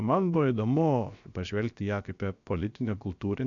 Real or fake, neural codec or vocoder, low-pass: fake; codec, 16 kHz, about 1 kbps, DyCAST, with the encoder's durations; 7.2 kHz